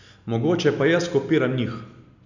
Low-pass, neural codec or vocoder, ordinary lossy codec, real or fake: 7.2 kHz; none; none; real